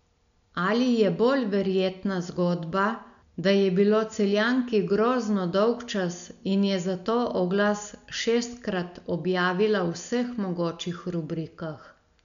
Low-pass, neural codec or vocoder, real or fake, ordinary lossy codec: 7.2 kHz; none; real; none